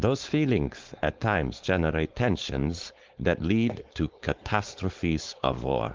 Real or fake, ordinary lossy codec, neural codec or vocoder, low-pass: fake; Opus, 16 kbps; codec, 16 kHz, 4.8 kbps, FACodec; 7.2 kHz